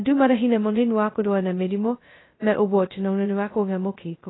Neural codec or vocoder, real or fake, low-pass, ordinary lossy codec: codec, 16 kHz, 0.2 kbps, FocalCodec; fake; 7.2 kHz; AAC, 16 kbps